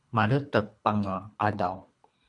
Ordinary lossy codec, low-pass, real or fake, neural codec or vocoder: MP3, 64 kbps; 10.8 kHz; fake; codec, 24 kHz, 3 kbps, HILCodec